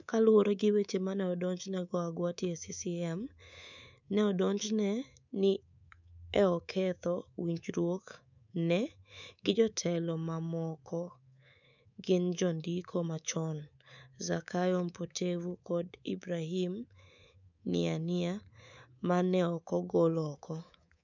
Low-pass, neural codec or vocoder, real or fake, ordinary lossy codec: 7.2 kHz; codec, 24 kHz, 3.1 kbps, DualCodec; fake; none